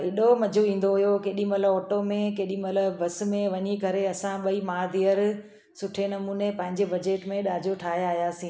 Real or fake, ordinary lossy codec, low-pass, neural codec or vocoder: real; none; none; none